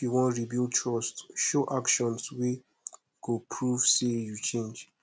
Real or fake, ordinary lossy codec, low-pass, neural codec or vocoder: real; none; none; none